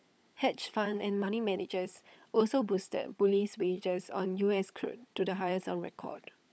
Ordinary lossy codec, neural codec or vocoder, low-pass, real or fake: none; codec, 16 kHz, 16 kbps, FunCodec, trained on LibriTTS, 50 frames a second; none; fake